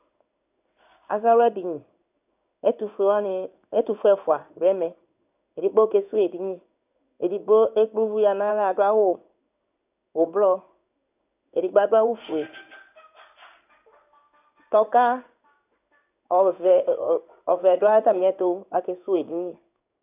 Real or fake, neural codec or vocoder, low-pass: fake; codec, 16 kHz in and 24 kHz out, 1 kbps, XY-Tokenizer; 3.6 kHz